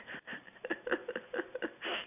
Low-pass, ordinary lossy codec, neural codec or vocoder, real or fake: 3.6 kHz; none; none; real